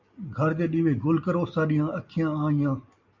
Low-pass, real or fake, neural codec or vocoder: 7.2 kHz; real; none